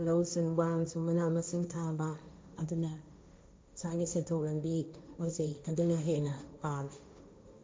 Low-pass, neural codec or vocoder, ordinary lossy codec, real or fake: none; codec, 16 kHz, 1.1 kbps, Voila-Tokenizer; none; fake